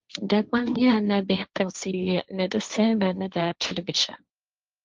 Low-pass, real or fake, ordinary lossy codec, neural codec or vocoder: 7.2 kHz; fake; Opus, 24 kbps; codec, 16 kHz, 1.1 kbps, Voila-Tokenizer